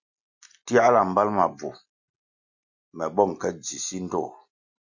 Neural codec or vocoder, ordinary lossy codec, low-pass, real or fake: none; Opus, 64 kbps; 7.2 kHz; real